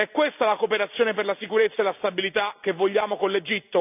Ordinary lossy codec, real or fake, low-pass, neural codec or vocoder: none; real; 3.6 kHz; none